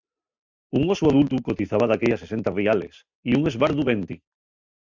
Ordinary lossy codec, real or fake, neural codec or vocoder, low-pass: MP3, 64 kbps; real; none; 7.2 kHz